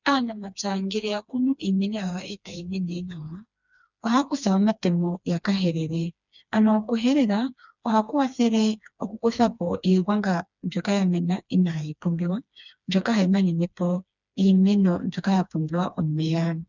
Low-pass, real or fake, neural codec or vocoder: 7.2 kHz; fake; codec, 16 kHz, 2 kbps, FreqCodec, smaller model